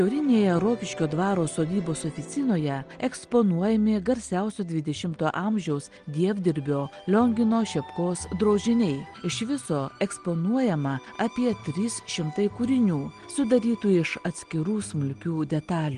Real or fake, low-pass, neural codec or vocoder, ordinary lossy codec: real; 9.9 kHz; none; Opus, 24 kbps